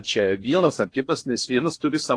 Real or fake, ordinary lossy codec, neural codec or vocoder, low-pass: fake; AAC, 48 kbps; codec, 16 kHz in and 24 kHz out, 0.6 kbps, FocalCodec, streaming, 2048 codes; 9.9 kHz